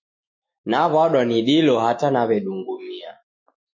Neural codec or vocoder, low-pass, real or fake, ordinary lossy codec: autoencoder, 48 kHz, 128 numbers a frame, DAC-VAE, trained on Japanese speech; 7.2 kHz; fake; MP3, 32 kbps